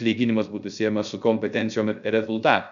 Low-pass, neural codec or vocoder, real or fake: 7.2 kHz; codec, 16 kHz, 0.3 kbps, FocalCodec; fake